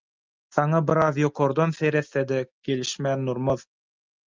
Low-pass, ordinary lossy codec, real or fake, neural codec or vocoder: 7.2 kHz; Opus, 24 kbps; real; none